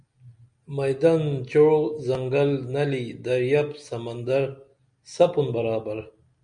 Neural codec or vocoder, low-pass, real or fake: none; 9.9 kHz; real